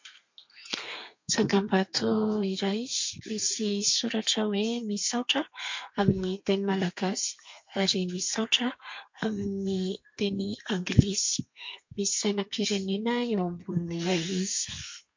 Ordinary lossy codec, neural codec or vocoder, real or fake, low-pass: MP3, 48 kbps; codec, 32 kHz, 1.9 kbps, SNAC; fake; 7.2 kHz